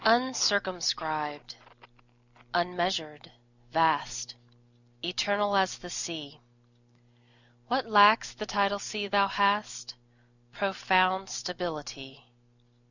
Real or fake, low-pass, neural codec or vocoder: real; 7.2 kHz; none